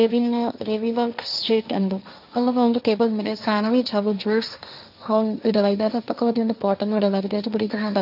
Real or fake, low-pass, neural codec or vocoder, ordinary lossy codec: fake; 5.4 kHz; codec, 16 kHz, 1.1 kbps, Voila-Tokenizer; none